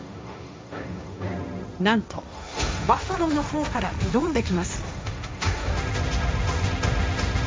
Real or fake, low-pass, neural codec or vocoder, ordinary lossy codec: fake; none; codec, 16 kHz, 1.1 kbps, Voila-Tokenizer; none